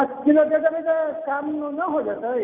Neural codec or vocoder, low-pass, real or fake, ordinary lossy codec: none; 3.6 kHz; real; none